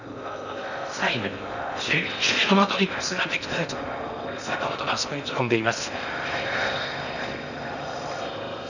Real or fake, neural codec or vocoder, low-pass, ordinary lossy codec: fake; codec, 16 kHz in and 24 kHz out, 0.6 kbps, FocalCodec, streaming, 4096 codes; 7.2 kHz; none